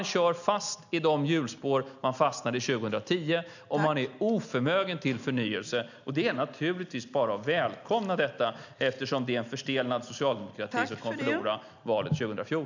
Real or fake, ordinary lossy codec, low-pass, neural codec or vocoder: real; none; 7.2 kHz; none